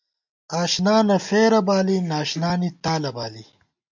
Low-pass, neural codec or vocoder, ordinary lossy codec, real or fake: 7.2 kHz; none; MP3, 64 kbps; real